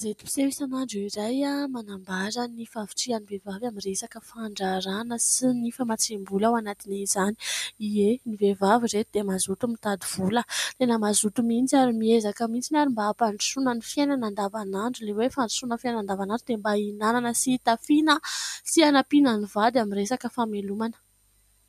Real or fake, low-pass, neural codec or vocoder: real; 14.4 kHz; none